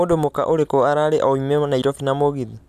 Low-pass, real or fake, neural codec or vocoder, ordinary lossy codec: 14.4 kHz; real; none; none